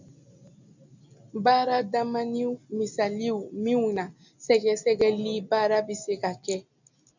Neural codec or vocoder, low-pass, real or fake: none; 7.2 kHz; real